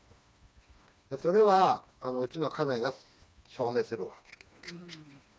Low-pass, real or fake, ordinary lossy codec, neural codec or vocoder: none; fake; none; codec, 16 kHz, 2 kbps, FreqCodec, smaller model